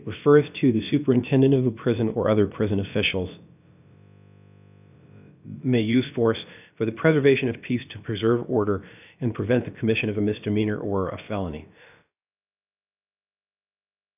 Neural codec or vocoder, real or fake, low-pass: codec, 16 kHz, about 1 kbps, DyCAST, with the encoder's durations; fake; 3.6 kHz